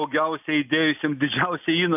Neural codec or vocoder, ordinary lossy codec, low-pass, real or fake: none; AAC, 32 kbps; 3.6 kHz; real